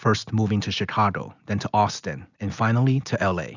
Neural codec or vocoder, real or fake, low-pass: none; real; 7.2 kHz